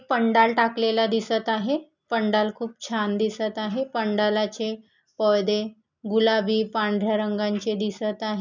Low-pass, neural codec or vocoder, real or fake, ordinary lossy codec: 7.2 kHz; none; real; none